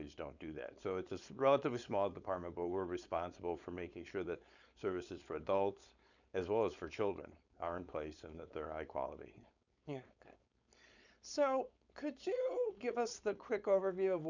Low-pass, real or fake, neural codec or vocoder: 7.2 kHz; fake; codec, 16 kHz, 4.8 kbps, FACodec